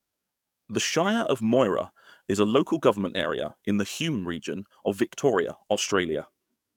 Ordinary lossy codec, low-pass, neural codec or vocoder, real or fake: none; 19.8 kHz; codec, 44.1 kHz, 7.8 kbps, DAC; fake